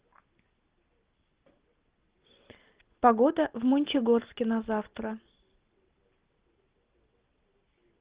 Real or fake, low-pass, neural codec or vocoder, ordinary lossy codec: real; 3.6 kHz; none; Opus, 16 kbps